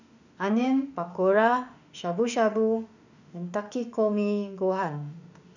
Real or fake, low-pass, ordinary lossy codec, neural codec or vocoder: fake; 7.2 kHz; none; autoencoder, 48 kHz, 32 numbers a frame, DAC-VAE, trained on Japanese speech